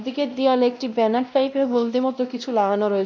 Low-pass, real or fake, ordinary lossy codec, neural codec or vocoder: none; fake; none; codec, 16 kHz, 1 kbps, X-Codec, WavLM features, trained on Multilingual LibriSpeech